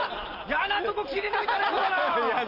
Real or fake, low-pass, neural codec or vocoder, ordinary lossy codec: real; 5.4 kHz; none; none